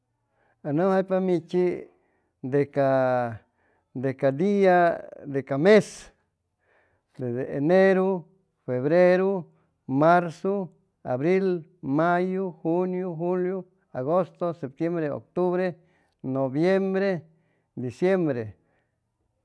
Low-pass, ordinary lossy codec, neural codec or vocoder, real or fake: none; none; none; real